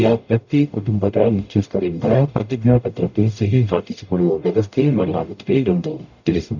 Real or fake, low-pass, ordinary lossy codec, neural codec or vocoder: fake; 7.2 kHz; none; codec, 44.1 kHz, 0.9 kbps, DAC